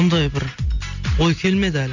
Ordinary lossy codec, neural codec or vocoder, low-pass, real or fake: none; none; 7.2 kHz; real